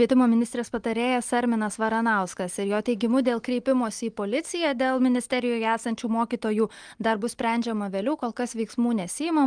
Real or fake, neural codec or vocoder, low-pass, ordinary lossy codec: real; none; 9.9 kHz; Opus, 24 kbps